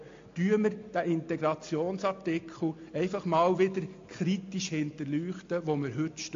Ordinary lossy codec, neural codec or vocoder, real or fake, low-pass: AAC, 48 kbps; none; real; 7.2 kHz